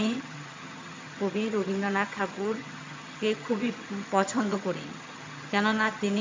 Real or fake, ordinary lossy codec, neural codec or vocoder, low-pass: fake; MP3, 48 kbps; vocoder, 22.05 kHz, 80 mel bands, HiFi-GAN; 7.2 kHz